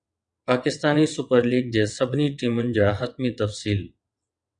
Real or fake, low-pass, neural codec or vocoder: fake; 9.9 kHz; vocoder, 22.05 kHz, 80 mel bands, WaveNeXt